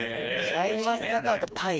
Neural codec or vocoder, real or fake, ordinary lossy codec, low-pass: codec, 16 kHz, 2 kbps, FreqCodec, smaller model; fake; none; none